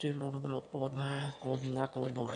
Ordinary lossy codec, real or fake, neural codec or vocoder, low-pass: AAC, 64 kbps; fake; autoencoder, 22.05 kHz, a latent of 192 numbers a frame, VITS, trained on one speaker; 9.9 kHz